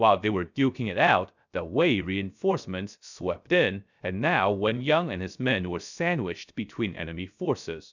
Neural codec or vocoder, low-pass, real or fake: codec, 16 kHz, 0.3 kbps, FocalCodec; 7.2 kHz; fake